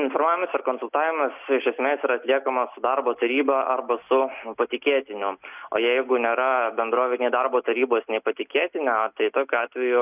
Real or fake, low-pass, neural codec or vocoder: real; 3.6 kHz; none